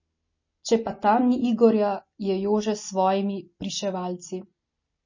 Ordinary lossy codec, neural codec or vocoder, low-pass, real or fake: MP3, 32 kbps; none; 7.2 kHz; real